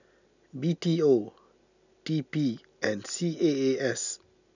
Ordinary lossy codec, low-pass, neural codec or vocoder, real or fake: none; 7.2 kHz; none; real